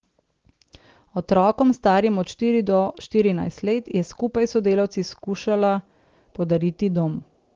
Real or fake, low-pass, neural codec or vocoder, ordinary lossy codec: real; 7.2 kHz; none; Opus, 16 kbps